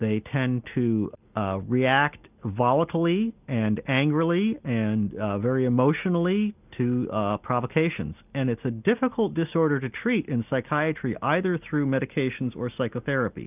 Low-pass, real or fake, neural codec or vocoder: 3.6 kHz; real; none